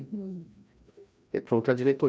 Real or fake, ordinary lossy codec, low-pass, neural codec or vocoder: fake; none; none; codec, 16 kHz, 1 kbps, FreqCodec, larger model